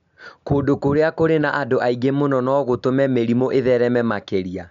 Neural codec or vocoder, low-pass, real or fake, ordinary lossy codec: none; 7.2 kHz; real; none